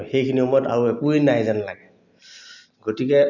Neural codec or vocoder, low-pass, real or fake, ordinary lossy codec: none; 7.2 kHz; real; none